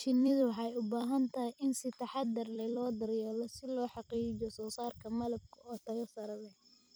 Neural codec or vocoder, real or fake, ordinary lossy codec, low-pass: vocoder, 44.1 kHz, 128 mel bands every 256 samples, BigVGAN v2; fake; none; none